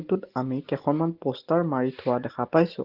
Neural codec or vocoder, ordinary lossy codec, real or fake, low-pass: none; Opus, 16 kbps; real; 5.4 kHz